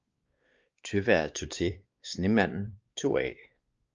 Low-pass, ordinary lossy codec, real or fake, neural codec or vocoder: 7.2 kHz; Opus, 24 kbps; fake; codec, 16 kHz, 2 kbps, X-Codec, WavLM features, trained on Multilingual LibriSpeech